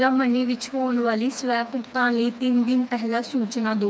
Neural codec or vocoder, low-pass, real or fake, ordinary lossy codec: codec, 16 kHz, 2 kbps, FreqCodec, smaller model; none; fake; none